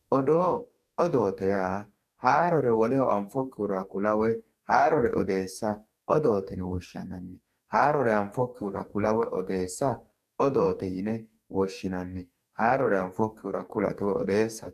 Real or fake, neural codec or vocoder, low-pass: fake; codec, 44.1 kHz, 2.6 kbps, DAC; 14.4 kHz